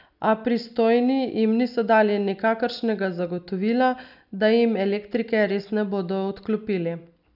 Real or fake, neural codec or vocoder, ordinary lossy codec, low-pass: real; none; none; 5.4 kHz